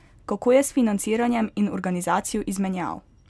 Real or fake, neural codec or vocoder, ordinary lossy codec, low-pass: real; none; none; none